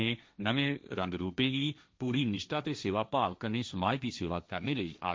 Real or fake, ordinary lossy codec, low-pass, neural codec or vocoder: fake; none; none; codec, 16 kHz, 1.1 kbps, Voila-Tokenizer